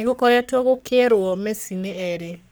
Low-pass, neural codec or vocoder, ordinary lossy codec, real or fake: none; codec, 44.1 kHz, 3.4 kbps, Pupu-Codec; none; fake